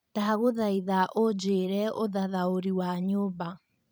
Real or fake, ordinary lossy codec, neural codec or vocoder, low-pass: fake; none; vocoder, 44.1 kHz, 128 mel bands every 512 samples, BigVGAN v2; none